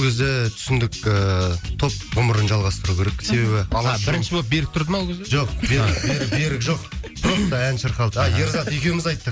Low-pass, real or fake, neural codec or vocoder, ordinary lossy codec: none; real; none; none